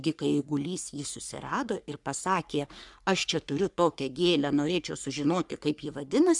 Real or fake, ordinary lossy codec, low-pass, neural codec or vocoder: fake; MP3, 96 kbps; 10.8 kHz; codec, 44.1 kHz, 3.4 kbps, Pupu-Codec